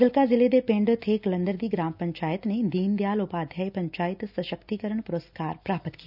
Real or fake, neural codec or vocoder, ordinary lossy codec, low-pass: real; none; none; 5.4 kHz